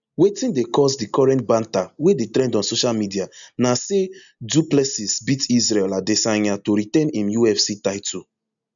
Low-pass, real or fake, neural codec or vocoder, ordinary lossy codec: 7.2 kHz; real; none; none